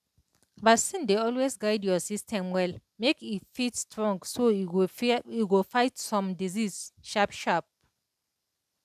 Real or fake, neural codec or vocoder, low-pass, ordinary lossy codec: real; none; 14.4 kHz; none